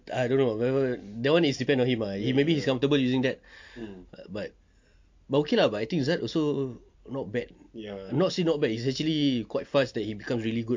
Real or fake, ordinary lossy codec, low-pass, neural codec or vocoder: real; MP3, 48 kbps; 7.2 kHz; none